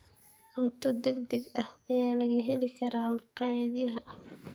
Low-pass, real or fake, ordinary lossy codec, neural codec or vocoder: none; fake; none; codec, 44.1 kHz, 2.6 kbps, SNAC